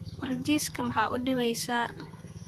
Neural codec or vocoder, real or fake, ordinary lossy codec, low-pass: codec, 32 kHz, 1.9 kbps, SNAC; fake; Opus, 64 kbps; 14.4 kHz